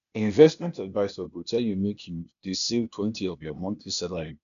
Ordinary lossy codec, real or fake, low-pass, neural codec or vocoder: none; fake; 7.2 kHz; codec, 16 kHz, 0.8 kbps, ZipCodec